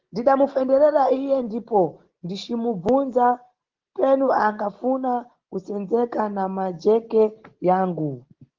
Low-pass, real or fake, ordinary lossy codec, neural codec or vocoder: 7.2 kHz; real; Opus, 16 kbps; none